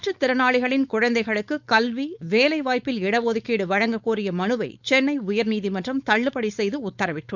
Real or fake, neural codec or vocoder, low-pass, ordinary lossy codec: fake; codec, 16 kHz, 4.8 kbps, FACodec; 7.2 kHz; none